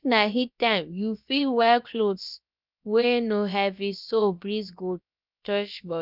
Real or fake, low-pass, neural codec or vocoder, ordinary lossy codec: fake; 5.4 kHz; codec, 16 kHz, about 1 kbps, DyCAST, with the encoder's durations; none